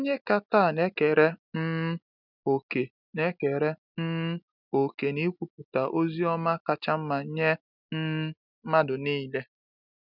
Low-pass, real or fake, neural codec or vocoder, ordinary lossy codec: 5.4 kHz; real; none; none